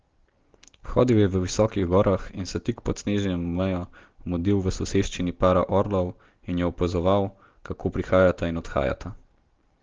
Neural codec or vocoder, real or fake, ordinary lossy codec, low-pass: none; real; Opus, 16 kbps; 7.2 kHz